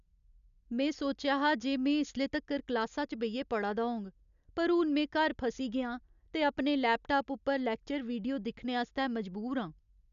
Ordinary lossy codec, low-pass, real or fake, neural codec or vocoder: none; 7.2 kHz; real; none